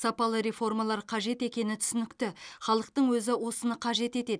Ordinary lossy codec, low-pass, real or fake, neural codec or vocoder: none; 9.9 kHz; real; none